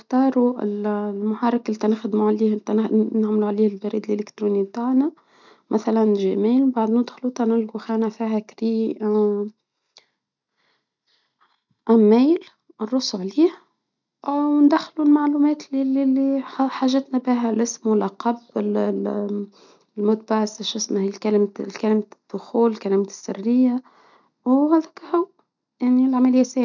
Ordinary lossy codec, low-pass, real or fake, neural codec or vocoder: none; 7.2 kHz; real; none